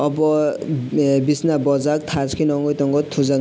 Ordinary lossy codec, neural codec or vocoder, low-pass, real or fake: none; none; none; real